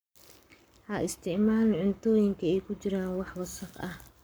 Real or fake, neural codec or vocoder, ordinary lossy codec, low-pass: fake; codec, 44.1 kHz, 7.8 kbps, Pupu-Codec; none; none